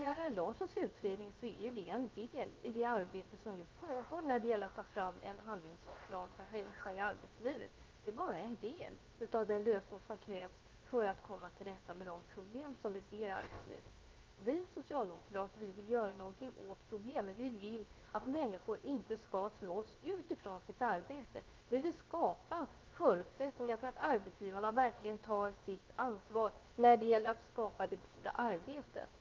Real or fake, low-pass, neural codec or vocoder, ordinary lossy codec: fake; 7.2 kHz; codec, 16 kHz, 0.7 kbps, FocalCodec; Opus, 32 kbps